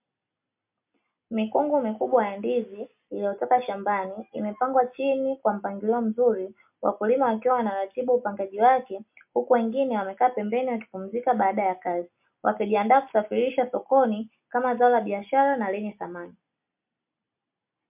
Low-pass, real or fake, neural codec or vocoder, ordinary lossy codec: 3.6 kHz; real; none; MP3, 32 kbps